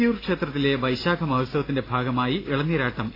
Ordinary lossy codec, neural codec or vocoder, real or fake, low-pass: AAC, 24 kbps; none; real; 5.4 kHz